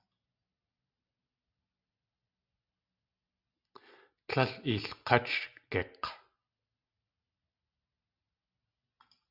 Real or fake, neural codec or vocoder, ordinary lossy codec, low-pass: real; none; Opus, 64 kbps; 5.4 kHz